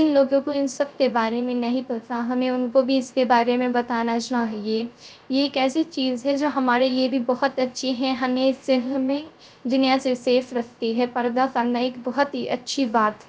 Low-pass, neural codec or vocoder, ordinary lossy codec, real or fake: none; codec, 16 kHz, 0.3 kbps, FocalCodec; none; fake